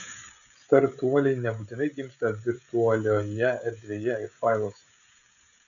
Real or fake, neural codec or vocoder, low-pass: fake; codec, 16 kHz, 16 kbps, FreqCodec, smaller model; 7.2 kHz